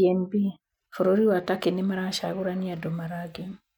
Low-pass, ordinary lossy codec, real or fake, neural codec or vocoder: 19.8 kHz; none; real; none